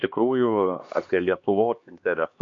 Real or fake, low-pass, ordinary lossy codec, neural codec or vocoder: fake; 7.2 kHz; MP3, 48 kbps; codec, 16 kHz, 2 kbps, X-Codec, HuBERT features, trained on LibriSpeech